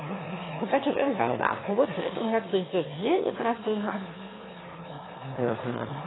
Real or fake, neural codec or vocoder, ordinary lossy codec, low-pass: fake; autoencoder, 22.05 kHz, a latent of 192 numbers a frame, VITS, trained on one speaker; AAC, 16 kbps; 7.2 kHz